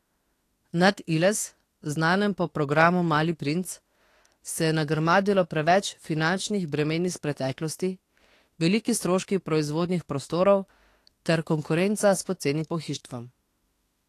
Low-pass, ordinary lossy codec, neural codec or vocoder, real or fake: 14.4 kHz; AAC, 48 kbps; autoencoder, 48 kHz, 32 numbers a frame, DAC-VAE, trained on Japanese speech; fake